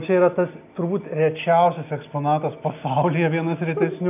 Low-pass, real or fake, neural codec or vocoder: 3.6 kHz; real; none